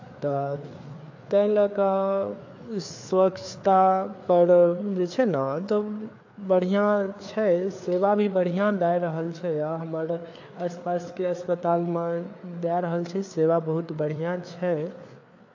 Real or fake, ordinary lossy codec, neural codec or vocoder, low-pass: fake; AAC, 48 kbps; codec, 16 kHz, 4 kbps, FreqCodec, larger model; 7.2 kHz